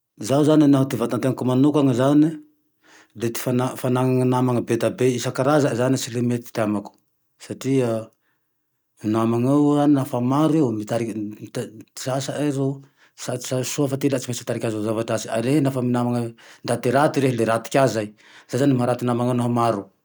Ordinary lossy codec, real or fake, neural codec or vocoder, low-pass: none; real; none; none